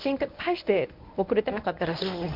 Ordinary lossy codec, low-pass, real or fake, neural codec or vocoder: MP3, 48 kbps; 5.4 kHz; fake; codec, 24 kHz, 0.9 kbps, WavTokenizer, medium speech release version 1